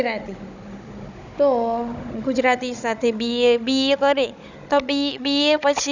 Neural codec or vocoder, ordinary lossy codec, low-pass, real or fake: codec, 16 kHz, 16 kbps, FunCodec, trained on Chinese and English, 50 frames a second; none; 7.2 kHz; fake